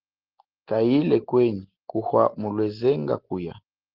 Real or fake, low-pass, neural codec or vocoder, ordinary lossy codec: real; 5.4 kHz; none; Opus, 16 kbps